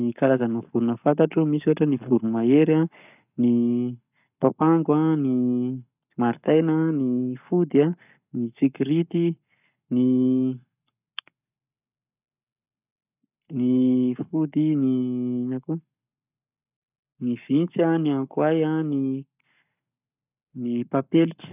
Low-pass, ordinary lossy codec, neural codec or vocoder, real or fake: 3.6 kHz; none; codec, 44.1 kHz, 7.8 kbps, Pupu-Codec; fake